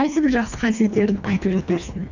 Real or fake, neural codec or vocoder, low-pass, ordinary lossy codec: fake; codec, 24 kHz, 1.5 kbps, HILCodec; 7.2 kHz; none